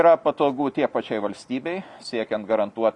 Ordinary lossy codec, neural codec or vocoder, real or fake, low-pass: Opus, 64 kbps; none; real; 10.8 kHz